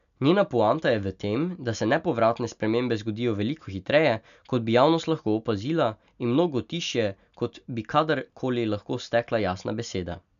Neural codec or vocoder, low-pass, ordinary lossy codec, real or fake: none; 7.2 kHz; none; real